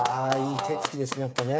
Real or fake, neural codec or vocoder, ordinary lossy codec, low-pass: fake; codec, 16 kHz, 8 kbps, FreqCodec, smaller model; none; none